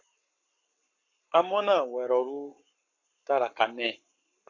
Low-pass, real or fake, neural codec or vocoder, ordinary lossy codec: 7.2 kHz; fake; codec, 44.1 kHz, 7.8 kbps, Pupu-Codec; AAC, 48 kbps